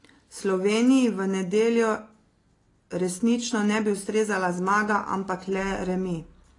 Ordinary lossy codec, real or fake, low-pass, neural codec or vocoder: AAC, 32 kbps; real; 10.8 kHz; none